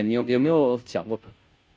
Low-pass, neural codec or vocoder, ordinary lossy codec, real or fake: none; codec, 16 kHz, 0.5 kbps, FunCodec, trained on Chinese and English, 25 frames a second; none; fake